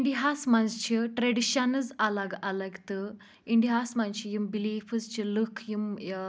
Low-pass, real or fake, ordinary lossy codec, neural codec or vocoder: none; real; none; none